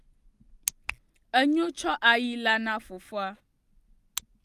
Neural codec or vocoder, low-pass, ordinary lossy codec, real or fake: none; 14.4 kHz; Opus, 32 kbps; real